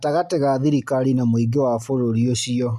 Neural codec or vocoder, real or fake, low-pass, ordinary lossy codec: none; real; 14.4 kHz; none